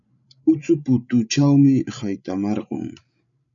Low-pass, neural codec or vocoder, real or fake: 7.2 kHz; codec, 16 kHz, 16 kbps, FreqCodec, larger model; fake